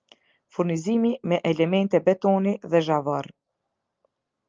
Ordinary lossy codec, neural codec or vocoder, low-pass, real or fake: Opus, 24 kbps; none; 7.2 kHz; real